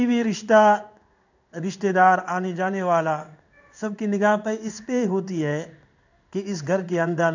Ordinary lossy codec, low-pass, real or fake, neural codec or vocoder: none; 7.2 kHz; fake; codec, 16 kHz in and 24 kHz out, 1 kbps, XY-Tokenizer